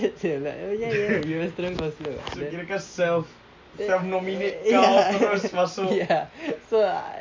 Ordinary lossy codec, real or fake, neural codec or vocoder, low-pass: MP3, 48 kbps; real; none; 7.2 kHz